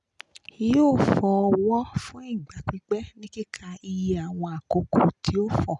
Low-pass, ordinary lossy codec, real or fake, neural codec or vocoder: 10.8 kHz; none; real; none